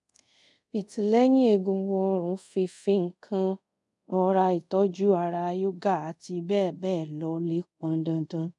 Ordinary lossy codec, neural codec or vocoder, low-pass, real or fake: none; codec, 24 kHz, 0.5 kbps, DualCodec; 10.8 kHz; fake